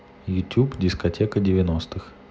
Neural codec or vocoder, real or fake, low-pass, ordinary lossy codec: none; real; none; none